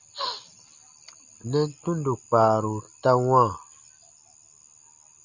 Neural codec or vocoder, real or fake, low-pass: none; real; 7.2 kHz